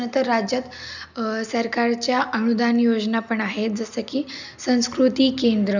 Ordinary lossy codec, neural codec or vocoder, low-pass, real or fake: none; none; 7.2 kHz; real